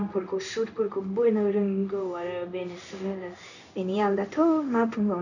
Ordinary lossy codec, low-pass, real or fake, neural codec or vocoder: none; 7.2 kHz; fake; codec, 16 kHz in and 24 kHz out, 1 kbps, XY-Tokenizer